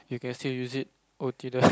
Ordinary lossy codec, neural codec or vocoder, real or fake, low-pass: none; none; real; none